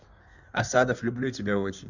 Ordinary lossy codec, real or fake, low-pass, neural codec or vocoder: none; fake; 7.2 kHz; codec, 16 kHz in and 24 kHz out, 1.1 kbps, FireRedTTS-2 codec